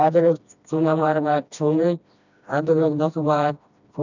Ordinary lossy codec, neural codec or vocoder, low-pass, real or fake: none; codec, 16 kHz, 1 kbps, FreqCodec, smaller model; 7.2 kHz; fake